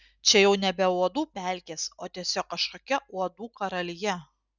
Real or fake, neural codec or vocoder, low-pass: real; none; 7.2 kHz